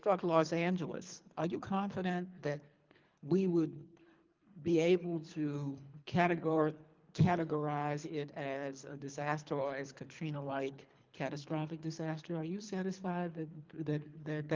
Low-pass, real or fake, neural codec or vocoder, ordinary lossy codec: 7.2 kHz; fake; codec, 24 kHz, 3 kbps, HILCodec; Opus, 24 kbps